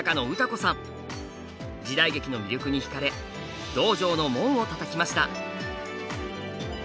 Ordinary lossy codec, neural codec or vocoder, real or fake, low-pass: none; none; real; none